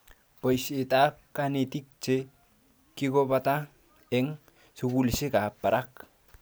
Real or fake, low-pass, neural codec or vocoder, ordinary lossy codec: real; none; none; none